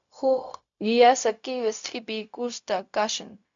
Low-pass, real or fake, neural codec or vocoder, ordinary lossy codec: 7.2 kHz; fake; codec, 16 kHz, 0.4 kbps, LongCat-Audio-Codec; MP3, 64 kbps